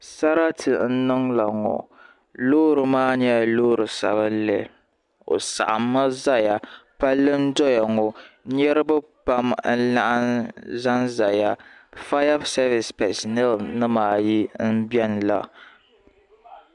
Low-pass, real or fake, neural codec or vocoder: 10.8 kHz; real; none